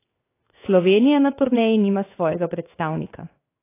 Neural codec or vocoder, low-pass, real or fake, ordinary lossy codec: codec, 16 kHz in and 24 kHz out, 1 kbps, XY-Tokenizer; 3.6 kHz; fake; AAC, 24 kbps